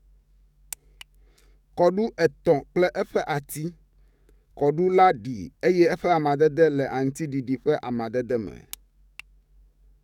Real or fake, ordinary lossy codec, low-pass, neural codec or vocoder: fake; none; 19.8 kHz; codec, 44.1 kHz, 7.8 kbps, DAC